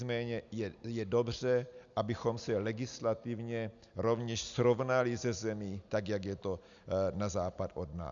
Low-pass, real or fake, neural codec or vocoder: 7.2 kHz; real; none